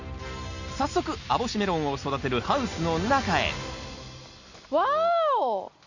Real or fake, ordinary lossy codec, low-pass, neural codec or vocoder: real; none; 7.2 kHz; none